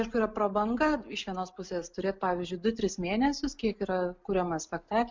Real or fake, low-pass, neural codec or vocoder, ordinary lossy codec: real; 7.2 kHz; none; MP3, 64 kbps